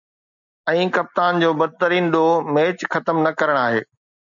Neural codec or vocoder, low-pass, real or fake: none; 7.2 kHz; real